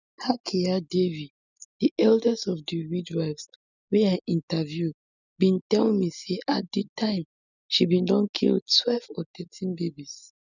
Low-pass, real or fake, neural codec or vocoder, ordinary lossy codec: 7.2 kHz; real; none; none